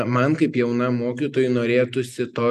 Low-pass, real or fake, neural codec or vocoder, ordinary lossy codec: 14.4 kHz; real; none; MP3, 96 kbps